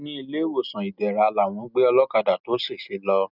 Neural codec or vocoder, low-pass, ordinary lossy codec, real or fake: none; 5.4 kHz; none; real